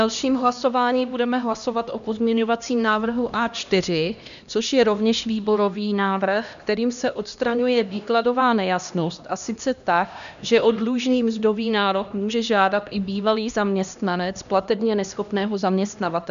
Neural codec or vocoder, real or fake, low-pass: codec, 16 kHz, 1 kbps, X-Codec, HuBERT features, trained on LibriSpeech; fake; 7.2 kHz